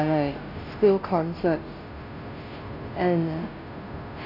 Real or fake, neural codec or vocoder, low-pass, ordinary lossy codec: fake; codec, 16 kHz, 0.5 kbps, FunCodec, trained on Chinese and English, 25 frames a second; 5.4 kHz; none